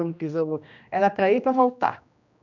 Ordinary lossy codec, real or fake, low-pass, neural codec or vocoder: none; fake; 7.2 kHz; codec, 16 kHz, 1 kbps, X-Codec, HuBERT features, trained on general audio